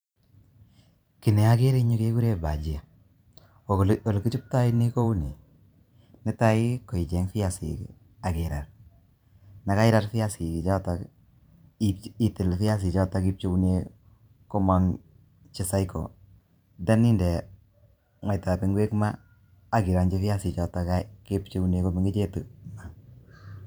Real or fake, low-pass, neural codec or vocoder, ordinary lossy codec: fake; none; vocoder, 44.1 kHz, 128 mel bands every 512 samples, BigVGAN v2; none